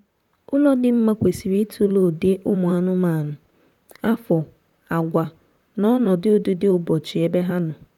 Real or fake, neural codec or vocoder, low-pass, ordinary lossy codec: fake; vocoder, 44.1 kHz, 128 mel bands, Pupu-Vocoder; 19.8 kHz; none